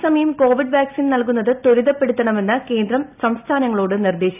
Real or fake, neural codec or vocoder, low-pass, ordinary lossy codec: real; none; 3.6 kHz; none